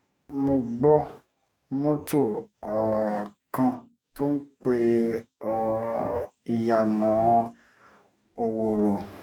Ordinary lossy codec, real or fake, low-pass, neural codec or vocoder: none; fake; 19.8 kHz; codec, 44.1 kHz, 2.6 kbps, DAC